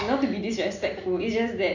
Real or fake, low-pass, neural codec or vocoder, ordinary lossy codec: real; 7.2 kHz; none; none